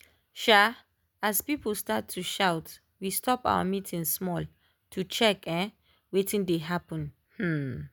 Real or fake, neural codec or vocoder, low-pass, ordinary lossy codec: real; none; none; none